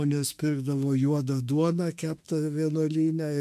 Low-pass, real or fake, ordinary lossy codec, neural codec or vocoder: 14.4 kHz; fake; AAC, 96 kbps; autoencoder, 48 kHz, 32 numbers a frame, DAC-VAE, trained on Japanese speech